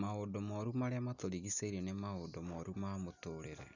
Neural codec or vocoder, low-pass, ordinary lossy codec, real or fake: none; 7.2 kHz; none; real